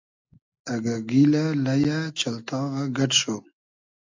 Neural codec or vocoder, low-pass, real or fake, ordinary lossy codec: none; 7.2 kHz; real; AAC, 48 kbps